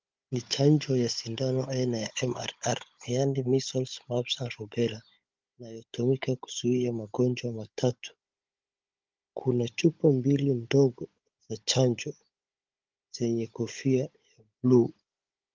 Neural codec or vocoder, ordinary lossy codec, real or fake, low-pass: codec, 16 kHz, 16 kbps, FunCodec, trained on Chinese and English, 50 frames a second; Opus, 32 kbps; fake; 7.2 kHz